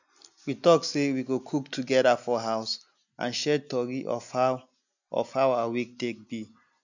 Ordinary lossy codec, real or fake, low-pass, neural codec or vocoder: none; real; 7.2 kHz; none